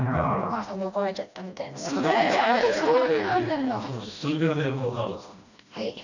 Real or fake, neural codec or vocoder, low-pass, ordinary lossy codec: fake; codec, 16 kHz, 1 kbps, FreqCodec, smaller model; 7.2 kHz; none